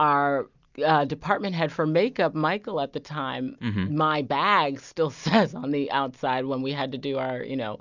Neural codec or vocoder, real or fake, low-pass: none; real; 7.2 kHz